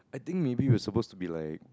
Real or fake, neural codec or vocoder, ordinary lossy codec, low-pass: real; none; none; none